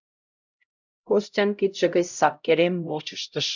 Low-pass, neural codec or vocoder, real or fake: 7.2 kHz; codec, 16 kHz, 0.5 kbps, X-Codec, HuBERT features, trained on LibriSpeech; fake